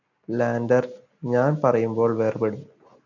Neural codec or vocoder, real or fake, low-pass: none; real; 7.2 kHz